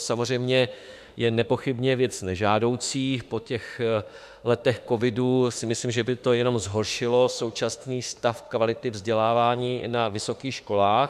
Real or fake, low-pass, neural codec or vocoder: fake; 14.4 kHz; autoencoder, 48 kHz, 32 numbers a frame, DAC-VAE, trained on Japanese speech